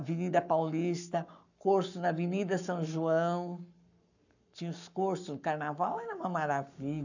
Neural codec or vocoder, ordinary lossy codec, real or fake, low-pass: codec, 44.1 kHz, 7.8 kbps, Pupu-Codec; none; fake; 7.2 kHz